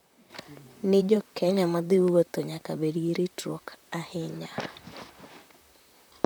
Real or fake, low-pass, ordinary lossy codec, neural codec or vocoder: fake; none; none; vocoder, 44.1 kHz, 128 mel bands, Pupu-Vocoder